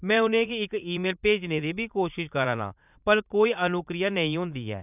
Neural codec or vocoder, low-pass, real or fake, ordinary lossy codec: vocoder, 44.1 kHz, 80 mel bands, Vocos; 3.6 kHz; fake; none